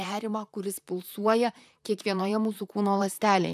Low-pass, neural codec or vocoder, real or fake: 14.4 kHz; vocoder, 44.1 kHz, 128 mel bands, Pupu-Vocoder; fake